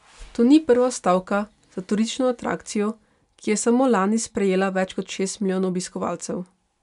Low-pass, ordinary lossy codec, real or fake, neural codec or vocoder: 10.8 kHz; none; real; none